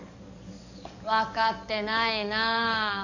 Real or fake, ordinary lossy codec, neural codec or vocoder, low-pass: fake; none; codec, 44.1 kHz, 7.8 kbps, DAC; 7.2 kHz